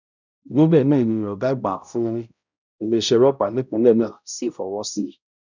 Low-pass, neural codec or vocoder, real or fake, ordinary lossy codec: 7.2 kHz; codec, 16 kHz, 0.5 kbps, X-Codec, HuBERT features, trained on balanced general audio; fake; none